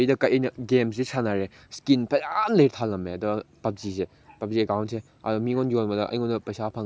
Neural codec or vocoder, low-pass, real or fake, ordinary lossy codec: none; none; real; none